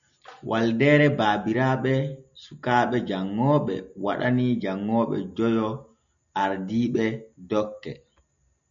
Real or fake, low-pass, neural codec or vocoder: real; 7.2 kHz; none